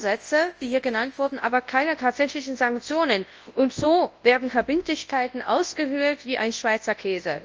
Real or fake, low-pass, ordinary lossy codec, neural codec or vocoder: fake; 7.2 kHz; Opus, 24 kbps; codec, 24 kHz, 0.9 kbps, WavTokenizer, large speech release